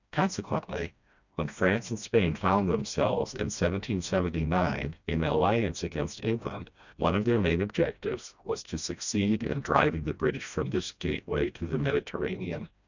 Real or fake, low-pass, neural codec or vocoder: fake; 7.2 kHz; codec, 16 kHz, 1 kbps, FreqCodec, smaller model